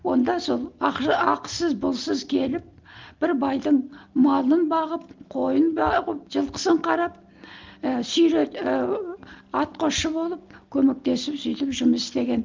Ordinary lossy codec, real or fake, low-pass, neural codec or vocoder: Opus, 32 kbps; real; 7.2 kHz; none